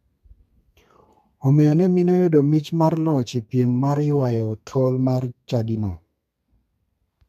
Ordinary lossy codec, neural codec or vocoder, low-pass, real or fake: MP3, 96 kbps; codec, 32 kHz, 1.9 kbps, SNAC; 14.4 kHz; fake